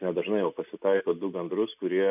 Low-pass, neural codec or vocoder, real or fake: 3.6 kHz; none; real